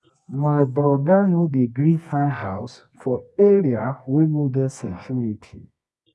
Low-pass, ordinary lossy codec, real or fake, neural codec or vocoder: none; none; fake; codec, 24 kHz, 0.9 kbps, WavTokenizer, medium music audio release